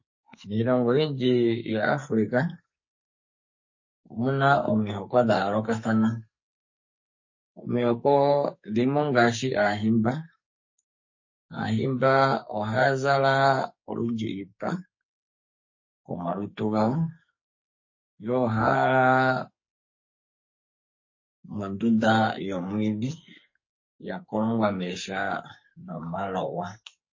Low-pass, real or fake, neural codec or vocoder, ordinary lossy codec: 7.2 kHz; fake; codec, 44.1 kHz, 2.6 kbps, SNAC; MP3, 32 kbps